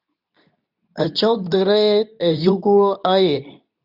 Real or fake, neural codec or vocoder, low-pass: fake; codec, 24 kHz, 0.9 kbps, WavTokenizer, medium speech release version 2; 5.4 kHz